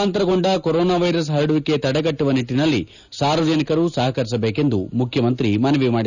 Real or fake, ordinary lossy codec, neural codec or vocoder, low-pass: real; none; none; 7.2 kHz